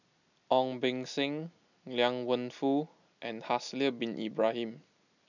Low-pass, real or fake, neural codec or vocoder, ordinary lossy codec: 7.2 kHz; real; none; none